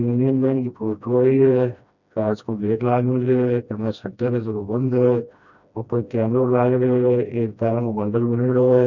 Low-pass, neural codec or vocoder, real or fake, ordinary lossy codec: 7.2 kHz; codec, 16 kHz, 1 kbps, FreqCodec, smaller model; fake; none